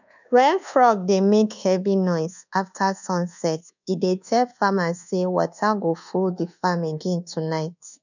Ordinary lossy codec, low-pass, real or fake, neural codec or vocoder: none; 7.2 kHz; fake; codec, 24 kHz, 1.2 kbps, DualCodec